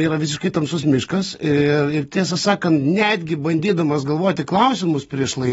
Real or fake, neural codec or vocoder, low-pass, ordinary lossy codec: real; none; 19.8 kHz; AAC, 24 kbps